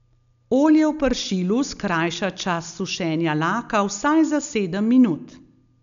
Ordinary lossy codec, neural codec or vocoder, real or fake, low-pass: none; none; real; 7.2 kHz